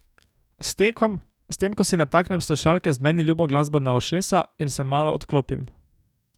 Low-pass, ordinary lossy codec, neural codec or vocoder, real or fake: 19.8 kHz; none; codec, 44.1 kHz, 2.6 kbps, DAC; fake